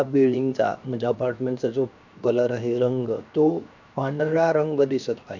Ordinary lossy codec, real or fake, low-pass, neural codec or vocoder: none; fake; 7.2 kHz; codec, 16 kHz, 0.7 kbps, FocalCodec